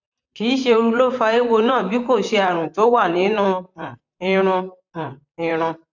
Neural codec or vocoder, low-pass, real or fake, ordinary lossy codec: vocoder, 44.1 kHz, 128 mel bands, Pupu-Vocoder; 7.2 kHz; fake; none